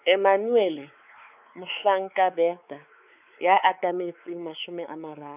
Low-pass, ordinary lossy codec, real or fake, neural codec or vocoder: 3.6 kHz; none; fake; codec, 16 kHz, 4 kbps, X-Codec, WavLM features, trained on Multilingual LibriSpeech